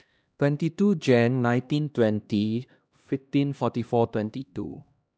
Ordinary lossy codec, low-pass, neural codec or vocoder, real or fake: none; none; codec, 16 kHz, 1 kbps, X-Codec, HuBERT features, trained on LibriSpeech; fake